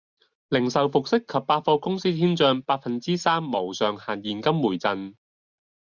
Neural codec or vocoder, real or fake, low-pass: none; real; 7.2 kHz